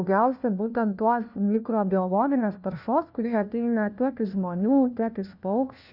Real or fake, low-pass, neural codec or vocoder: fake; 5.4 kHz; codec, 16 kHz, 1 kbps, FunCodec, trained on LibriTTS, 50 frames a second